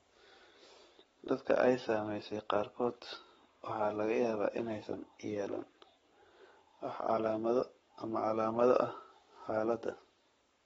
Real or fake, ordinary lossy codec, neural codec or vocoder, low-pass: fake; AAC, 24 kbps; codec, 44.1 kHz, 7.8 kbps, Pupu-Codec; 19.8 kHz